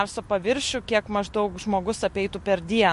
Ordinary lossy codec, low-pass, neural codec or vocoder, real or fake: MP3, 48 kbps; 14.4 kHz; none; real